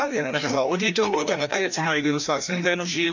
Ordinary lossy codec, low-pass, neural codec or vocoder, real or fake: none; 7.2 kHz; codec, 16 kHz, 1 kbps, FreqCodec, larger model; fake